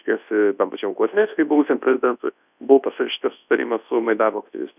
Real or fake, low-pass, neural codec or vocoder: fake; 3.6 kHz; codec, 24 kHz, 0.9 kbps, WavTokenizer, large speech release